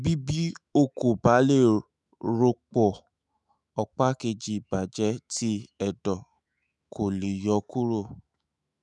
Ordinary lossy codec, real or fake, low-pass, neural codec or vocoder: none; fake; 10.8 kHz; autoencoder, 48 kHz, 128 numbers a frame, DAC-VAE, trained on Japanese speech